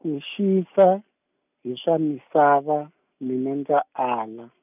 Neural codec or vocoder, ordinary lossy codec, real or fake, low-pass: none; none; real; 3.6 kHz